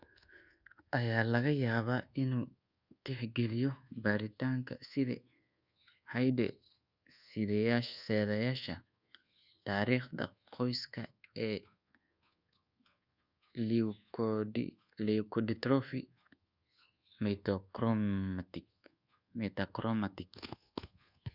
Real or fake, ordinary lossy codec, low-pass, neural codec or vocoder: fake; Opus, 64 kbps; 5.4 kHz; codec, 24 kHz, 1.2 kbps, DualCodec